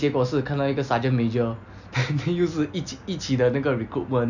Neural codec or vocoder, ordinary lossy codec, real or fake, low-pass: none; none; real; 7.2 kHz